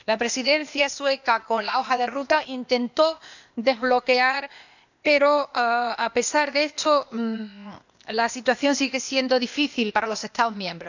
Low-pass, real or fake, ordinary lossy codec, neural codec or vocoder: 7.2 kHz; fake; none; codec, 16 kHz, 0.8 kbps, ZipCodec